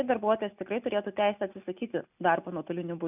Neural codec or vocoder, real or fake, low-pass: none; real; 3.6 kHz